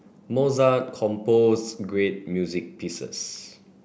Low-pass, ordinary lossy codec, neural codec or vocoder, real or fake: none; none; none; real